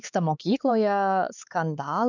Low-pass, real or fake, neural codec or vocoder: 7.2 kHz; fake; codec, 16 kHz, 4 kbps, X-Codec, HuBERT features, trained on LibriSpeech